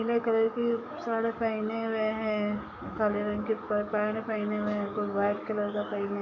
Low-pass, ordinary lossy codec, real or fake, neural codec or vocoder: 7.2 kHz; none; fake; codec, 44.1 kHz, 7.8 kbps, DAC